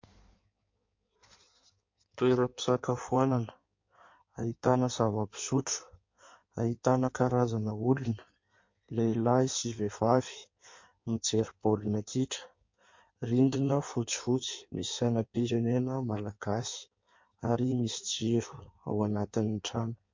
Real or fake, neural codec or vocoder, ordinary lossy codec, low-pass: fake; codec, 16 kHz in and 24 kHz out, 1.1 kbps, FireRedTTS-2 codec; MP3, 48 kbps; 7.2 kHz